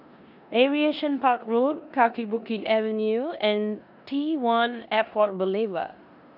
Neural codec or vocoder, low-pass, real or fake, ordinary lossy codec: codec, 16 kHz in and 24 kHz out, 0.9 kbps, LongCat-Audio-Codec, four codebook decoder; 5.4 kHz; fake; none